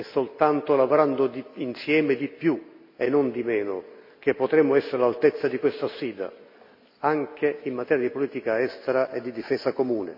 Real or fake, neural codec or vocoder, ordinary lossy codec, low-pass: real; none; MP3, 32 kbps; 5.4 kHz